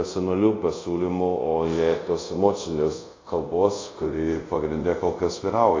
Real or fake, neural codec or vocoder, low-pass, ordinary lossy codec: fake; codec, 24 kHz, 0.5 kbps, DualCodec; 7.2 kHz; AAC, 32 kbps